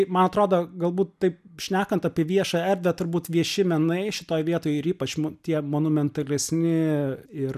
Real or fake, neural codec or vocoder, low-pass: real; none; 14.4 kHz